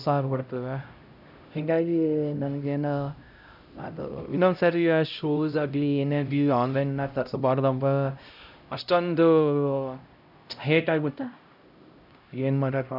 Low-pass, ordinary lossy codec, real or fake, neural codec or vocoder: 5.4 kHz; none; fake; codec, 16 kHz, 0.5 kbps, X-Codec, HuBERT features, trained on LibriSpeech